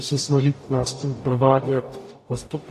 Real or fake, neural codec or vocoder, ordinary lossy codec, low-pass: fake; codec, 44.1 kHz, 0.9 kbps, DAC; AAC, 64 kbps; 14.4 kHz